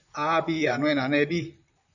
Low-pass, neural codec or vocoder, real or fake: 7.2 kHz; vocoder, 44.1 kHz, 128 mel bands, Pupu-Vocoder; fake